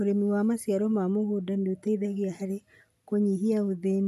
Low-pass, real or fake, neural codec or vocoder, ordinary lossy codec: 14.4 kHz; real; none; none